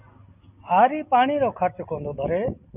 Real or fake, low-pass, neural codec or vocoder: real; 3.6 kHz; none